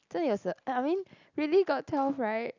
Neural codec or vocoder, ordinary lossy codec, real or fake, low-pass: none; none; real; 7.2 kHz